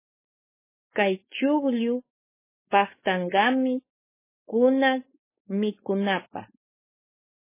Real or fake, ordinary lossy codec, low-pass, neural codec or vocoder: fake; MP3, 16 kbps; 3.6 kHz; codec, 16 kHz, 4.8 kbps, FACodec